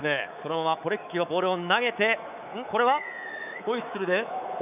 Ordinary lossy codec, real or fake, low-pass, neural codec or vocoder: none; fake; 3.6 kHz; codec, 24 kHz, 3.1 kbps, DualCodec